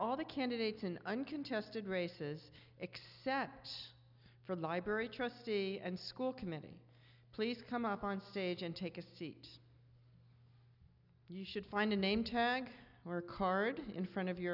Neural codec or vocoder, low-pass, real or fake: none; 5.4 kHz; real